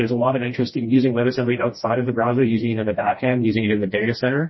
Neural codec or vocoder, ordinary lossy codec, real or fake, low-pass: codec, 16 kHz, 1 kbps, FreqCodec, smaller model; MP3, 24 kbps; fake; 7.2 kHz